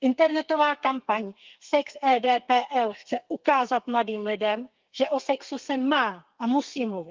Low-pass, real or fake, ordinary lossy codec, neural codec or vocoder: 7.2 kHz; fake; Opus, 32 kbps; codec, 32 kHz, 1.9 kbps, SNAC